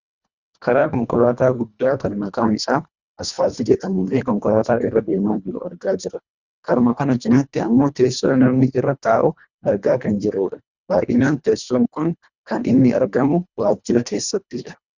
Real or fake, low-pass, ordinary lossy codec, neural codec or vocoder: fake; 7.2 kHz; Opus, 64 kbps; codec, 24 kHz, 1.5 kbps, HILCodec